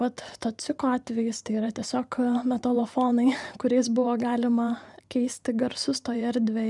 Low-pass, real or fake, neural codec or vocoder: 10.8 kHz; fake; vocoder, 44.1 kHz, 128 mel bands every 512 samples, BigVGAN v2